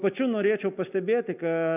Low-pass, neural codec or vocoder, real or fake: 3.6 kHz; none; real